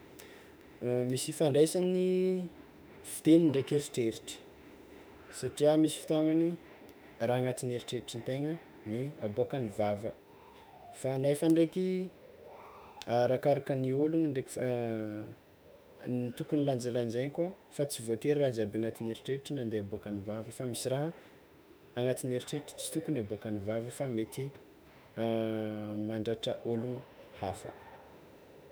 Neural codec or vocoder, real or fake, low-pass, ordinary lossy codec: autoencoder, 48 kHz, 32 numbers a frame, DAC-VAE, trained on Japanese speech; fake; none; none